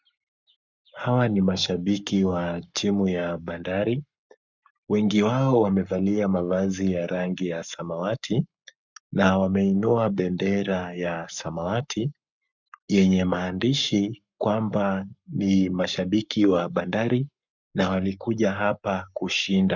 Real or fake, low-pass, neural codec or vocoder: fake; 7.2 kHz; codec, 44.1 kHz, 7.8 kbps, Pupu-Codec